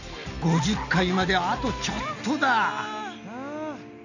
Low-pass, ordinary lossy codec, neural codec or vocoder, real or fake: 7.2 kHz; none; none; real